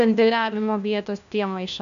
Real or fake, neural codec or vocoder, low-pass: fake; codec, 16 kHz, 1 kbps, FunCodec, trained on LibriTTS, 50 frames a second; 7.2 kHz